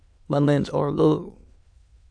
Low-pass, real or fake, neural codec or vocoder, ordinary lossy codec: 9.9 kHz; fake; autoencoder, 22.05 kHz, a latent of 192 numbers a frame, VITS, trained on many speakers; AAC, 64 kbps